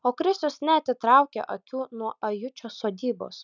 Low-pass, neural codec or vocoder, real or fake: 7.2 kHz; none; real